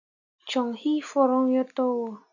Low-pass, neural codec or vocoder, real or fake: 7.2 kHz; none; real